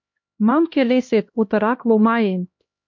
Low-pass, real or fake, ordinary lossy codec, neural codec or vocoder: 7.2 kHz; fake; MP3, 48 kbps; codec, 16 kHz, 1 kbps, X-Codec, HuBERT features, trained on LibriSpeech